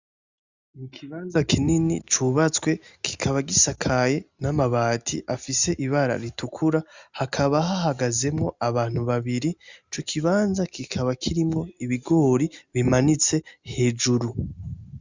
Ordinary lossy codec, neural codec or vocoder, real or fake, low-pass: Opus, 64 kbps; none; real; 7.2 kHz